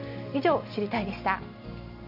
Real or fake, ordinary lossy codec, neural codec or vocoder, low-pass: real; none; none; 5.4 kHz